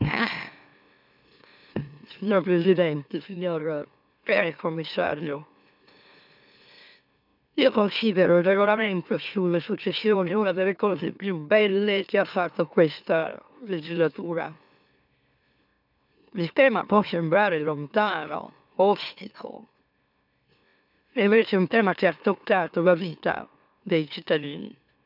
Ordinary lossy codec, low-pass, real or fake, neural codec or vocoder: none; 5.4 kHz; fake; autoencoder, 44.1 kHz, a latent of 192 numbers a frame, MeloTTS